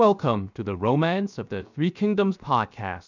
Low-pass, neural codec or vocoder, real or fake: 7.2 kHz; codec, 16 kHz, 0.7 kbps, FocalCodec; fake